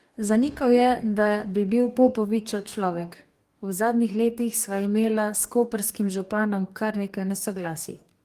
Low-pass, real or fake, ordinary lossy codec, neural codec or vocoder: 14.4 kHz; fake; Opus, 32 kbps; codec, 44.1 kHz, 2.6 kbps, DAC